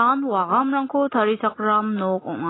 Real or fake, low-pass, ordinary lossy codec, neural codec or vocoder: real; 7.2 kHz; AAC, 16 kbps; none